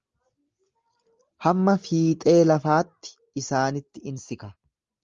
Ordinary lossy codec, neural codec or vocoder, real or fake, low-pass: Opus, 32 kbps; none; real; 7.2 kHz